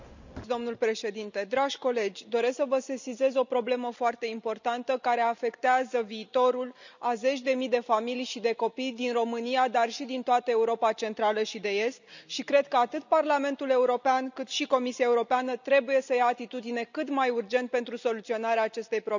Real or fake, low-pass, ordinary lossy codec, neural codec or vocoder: real; 7.2 kHz; none; none